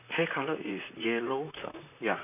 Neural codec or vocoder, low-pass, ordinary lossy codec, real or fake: vocoder, 44.1 kHz, 128 mel bands, Pupu-Vocoder; 3.6 kHz; none; fake